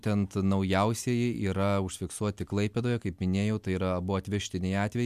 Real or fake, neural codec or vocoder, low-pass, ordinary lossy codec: real; none; 14.4 kHz; MP3, 96 kbps